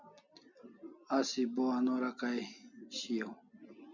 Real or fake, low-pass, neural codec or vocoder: real; 7.2 kHz; none